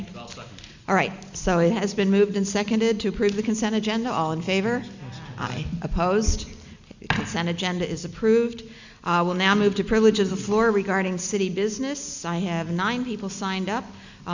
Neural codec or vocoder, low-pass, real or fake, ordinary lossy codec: none; 7.2 kHz; real; Opus, 64 kbps